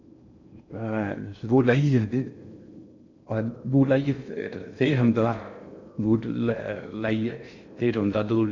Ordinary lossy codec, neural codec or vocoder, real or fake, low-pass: none; codec, 16 kHz in and 24 kHz out, 0.6 kbps, FocalCodec, streaming, 2048 codes; fake; 7.2 kHz